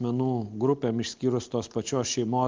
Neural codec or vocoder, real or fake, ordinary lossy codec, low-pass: none; real; Opus, 24 kbps; 7.2 kHz